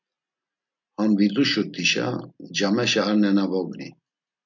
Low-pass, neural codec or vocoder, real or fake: 7.2 kHz; none; real